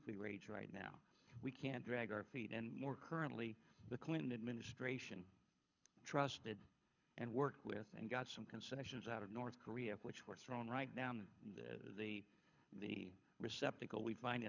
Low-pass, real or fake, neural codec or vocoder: 7.2 kHz; fake; codec, 24 kHz, 6 kbps, HILCodec